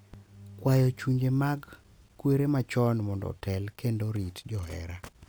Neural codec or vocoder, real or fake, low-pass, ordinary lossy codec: none; real; none; none